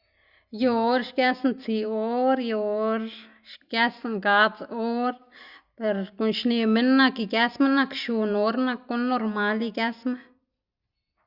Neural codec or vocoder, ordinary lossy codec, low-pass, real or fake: none; Opus, 64 kbps; 5.4 kHz; real